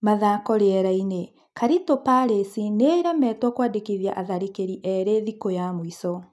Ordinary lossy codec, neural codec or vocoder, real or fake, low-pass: none; none; real; none